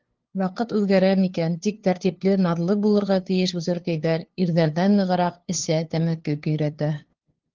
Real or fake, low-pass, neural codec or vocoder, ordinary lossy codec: fake; 7.2 kHz; codec, 16 kHz, 2 kbps, FunCodec, trained on LibriTTS, 25 frames a second; Opus, 16 kbps